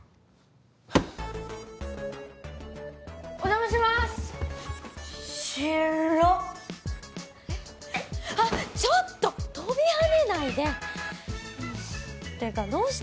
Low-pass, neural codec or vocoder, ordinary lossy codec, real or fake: none; none; none; real